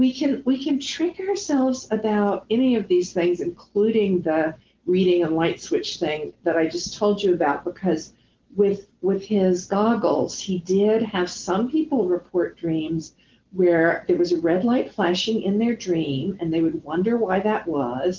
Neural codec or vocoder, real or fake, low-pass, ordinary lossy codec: none; real; 7.2 kHz; Opus, 16 kbps